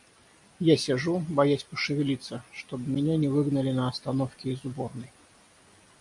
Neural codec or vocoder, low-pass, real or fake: none; 10.8 kHz; real